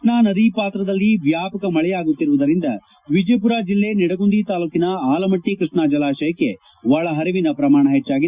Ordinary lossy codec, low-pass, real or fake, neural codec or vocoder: Opus, 64 kbps; 3.6 kHz; real; none